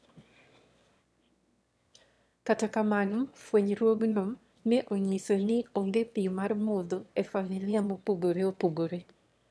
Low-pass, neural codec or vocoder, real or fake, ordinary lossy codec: none; autoencoder, 22.05 kHz, a latent of 192 numbers a frame, VITS, trained on one speaker; fake; none